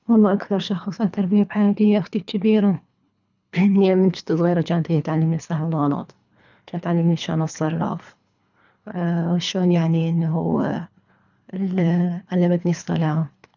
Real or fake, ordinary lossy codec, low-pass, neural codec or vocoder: fake; none; 7.2 kHz; codec, 24 kHz, 3 kbps, HILCodec